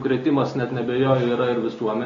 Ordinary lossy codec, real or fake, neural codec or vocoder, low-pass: AAC, 32 kbps; real; none; 7.2 kHz